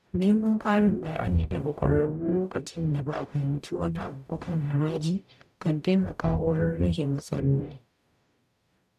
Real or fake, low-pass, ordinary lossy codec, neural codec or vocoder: fake; 14.4 kHz; none; codec, 44.1 kHz, 0.9 kbps, DAC